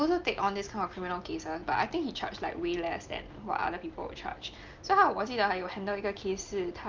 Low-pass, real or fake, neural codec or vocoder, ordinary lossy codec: 7.2 kHz; real; none; Opus, 24 kbps